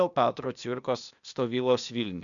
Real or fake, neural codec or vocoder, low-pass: fake; codec, 16 kHz, 0.8 kbps, ZipCodec; 7.2 kHz